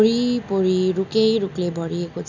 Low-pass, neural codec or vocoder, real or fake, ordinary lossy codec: 7.2 kHz; none; real; none